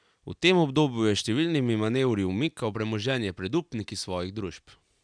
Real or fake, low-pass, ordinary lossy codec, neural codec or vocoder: real; 9.9 kHz; none; none